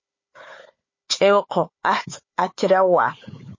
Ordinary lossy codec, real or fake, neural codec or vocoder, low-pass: MP3, 32 kbps; fake; codec, 16 kHz, 4 kbps, FunCodec, trained on Chinese and English, 50 frames a second; 7.2 kHz